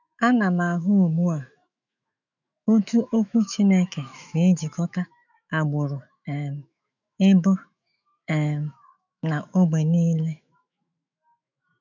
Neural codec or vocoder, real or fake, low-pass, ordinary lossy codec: autoencoder, 48 kHz, 128 numbers a frame, DAC-VAE, trained on Japanese speech; fake; 7.2 kHz; none